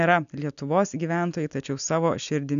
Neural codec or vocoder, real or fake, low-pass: none; real; 7.2 kHz